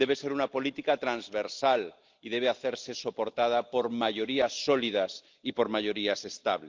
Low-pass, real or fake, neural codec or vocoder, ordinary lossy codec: 7.2 kHz; real; none; Opus, 32 kbps